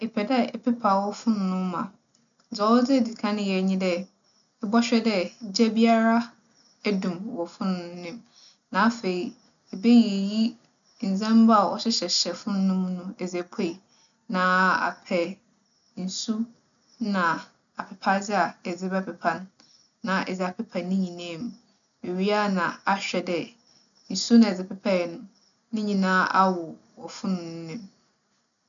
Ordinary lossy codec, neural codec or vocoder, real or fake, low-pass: none; none; real; 7.2 kHz